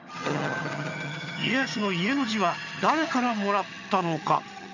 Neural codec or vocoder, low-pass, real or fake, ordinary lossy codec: vocoder, 22.05 kHz, 80 mel bands, HiFi-GAN; 7.2 kHz; fake; none